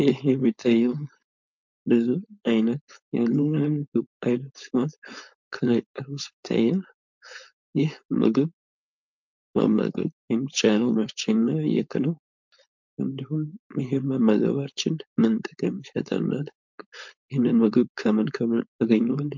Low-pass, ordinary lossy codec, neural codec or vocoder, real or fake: 7.2 kHz; MP3, 64 kbps; codec, 16 kHz, 4.8 kbps, FACodec; fake